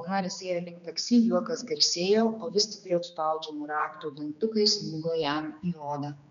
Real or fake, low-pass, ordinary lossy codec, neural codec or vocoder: fake; 7.2 kHz; MP3, 96 kbps; codec, 16 kHz, 2 kbps, X-Codec, HuBERT features, trained on general audio